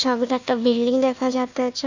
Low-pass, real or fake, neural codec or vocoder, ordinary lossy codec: 7.2 kHz; fake; codec, 16 kHz, 1 kbps, FunCodec, trained on Chinese and English, 50 frames a second; none